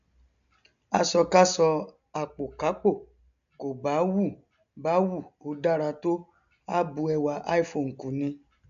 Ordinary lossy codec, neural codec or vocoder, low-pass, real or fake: none; none; 7.2 kHz; real